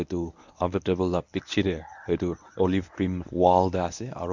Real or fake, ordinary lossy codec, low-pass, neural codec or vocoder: fake; none; 7.2 kHz; codec, 24 kHz, 0.9 kbps, WavTokenizer, medium speech release version 1